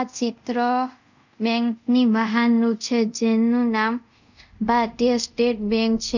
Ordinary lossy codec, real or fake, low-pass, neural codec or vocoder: none; fake; 7.2 kHz; codec, 24 kHz, 0.5 kbps, DualCodec